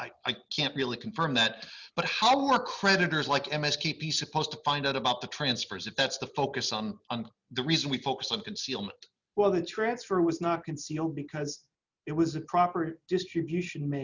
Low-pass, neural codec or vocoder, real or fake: 7.2 kHz; none; real